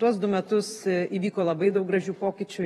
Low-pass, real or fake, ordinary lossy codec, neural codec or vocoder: 19.8 kHz; fake; AAC, 32 kbps; vocoder, 44.1 kHz, 128 mel bands every 512 samples, BigVGAN v2